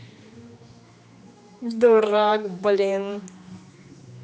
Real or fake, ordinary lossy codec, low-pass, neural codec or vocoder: fake; none; none; codec, 16 kHz, 2 kbps, X-Codec, HuBERT features, trained on general audio